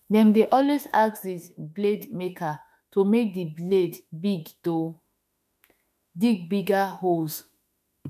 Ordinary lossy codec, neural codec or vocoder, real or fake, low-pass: none; autoencoder, 48 kHz, 32 numbers a frame, DAC-VAE, trained on Japanese speech; fake; 14.4 kHz